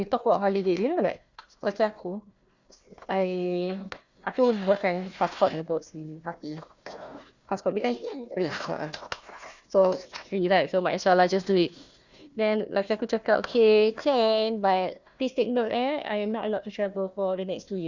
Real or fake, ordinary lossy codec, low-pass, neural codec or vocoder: fake; Opus, 64 kbps; 7.2 kHz; codec, 16 kHz, 1 kbps, FunCodec, trained on Chinese and English, 50 frames a second